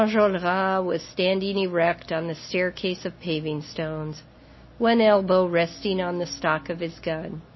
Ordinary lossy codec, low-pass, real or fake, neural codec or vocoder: MP3, 24 kbps; 7.2 kHz; real; none